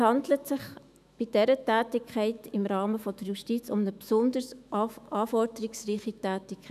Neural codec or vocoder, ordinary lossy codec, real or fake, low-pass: autoencoder, 48 kHz, 128 numbers a frame, DAC-VAE, trained on Japanese speech; none; fake; 14.4 kHz